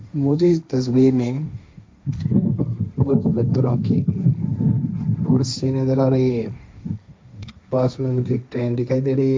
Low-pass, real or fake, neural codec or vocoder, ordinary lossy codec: none; fake; codec, 16 kHz, 1.1 kbps, Voila-Tokenizer; none